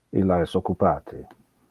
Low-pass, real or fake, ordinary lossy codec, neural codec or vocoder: 14.4 kHz; real; Opus, 32 kbps; none